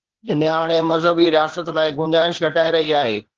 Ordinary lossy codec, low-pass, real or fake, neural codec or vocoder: Opus, 16 kbps; 7.2 kHz; fake; codec, 16 kHz, 0.8 kbps, ZipCodec